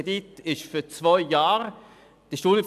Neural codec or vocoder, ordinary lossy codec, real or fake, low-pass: none; none; real; 14.4 kHz